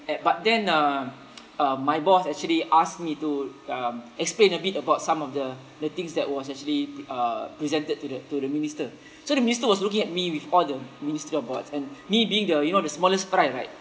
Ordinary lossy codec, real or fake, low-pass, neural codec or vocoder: none; real; none; none